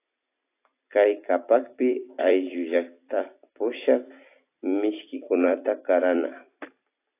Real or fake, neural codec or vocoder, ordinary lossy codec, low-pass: fake; vocoder, 44.1 kHz, 128 mel bands every 256 samples, BigVGAN v2; AAC, 24 kbps; 3.6 kHz